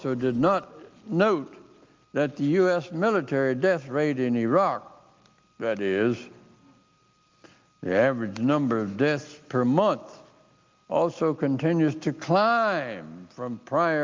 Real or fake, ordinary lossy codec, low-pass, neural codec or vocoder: real; Opus, 24 kbps; 7.2 kHz; none